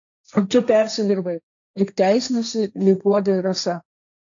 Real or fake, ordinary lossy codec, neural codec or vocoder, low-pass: fake; AAC, 64 kbps; codec, 16 kHz, 1.1 kbps, Voila-Tokenizer; 7.2 kHz